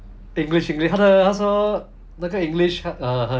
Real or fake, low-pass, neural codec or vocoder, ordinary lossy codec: real; none; none; none